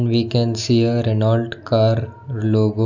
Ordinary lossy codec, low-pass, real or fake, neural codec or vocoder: none; 7.2 kHz; real; none